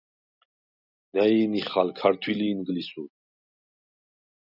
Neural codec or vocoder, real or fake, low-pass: none; real; 5.4 kHz